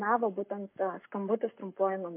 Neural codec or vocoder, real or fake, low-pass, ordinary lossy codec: codec, 44.1 kHz, 7.8 kbps, Pupu-Codec; fake; 3.6 kHz; MP3, 24 kbps